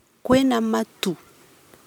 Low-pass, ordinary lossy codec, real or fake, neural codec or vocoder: 19.8 kHz; none; fake; vocoder, 44.1 kHz, 128 mel bands every 256 samples, BigVGAN v2